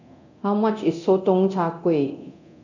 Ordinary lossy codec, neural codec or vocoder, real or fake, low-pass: none; codec, 24 kHz, 0.9 kbps, DualCodec; fake; 7.2 kHz